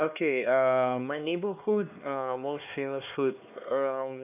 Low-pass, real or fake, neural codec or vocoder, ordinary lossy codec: 3.6 kHz; fake; codec, 16 kHz, 2 kbps, X-Codec, HuBERT features, trained on LibriSpeech; none